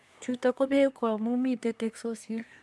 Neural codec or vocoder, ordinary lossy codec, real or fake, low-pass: codec, 24 kHz, 1 kbps, SNAC; none; fake; none